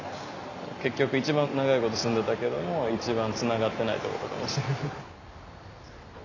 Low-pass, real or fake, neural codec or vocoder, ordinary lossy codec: 7.2 kHz; real; none; none